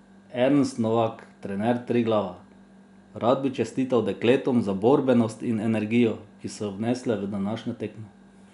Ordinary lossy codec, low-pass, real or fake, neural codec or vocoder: none; 10.8 kHz; real; none